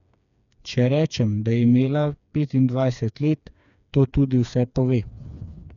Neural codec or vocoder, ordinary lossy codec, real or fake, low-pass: codec, 16 kHz, 4 kbps, FreqCodec, smaller model; none; fake; 7.2 kHz